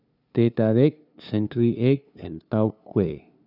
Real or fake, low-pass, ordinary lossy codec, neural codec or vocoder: fake; 5.4 kHz; none; codec, 16 kHz, 2 kbps, FunCodec, trained on LibriTTS, 25 frames a second